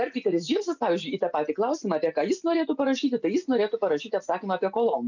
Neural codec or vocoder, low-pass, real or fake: none; 7.2 kHz; real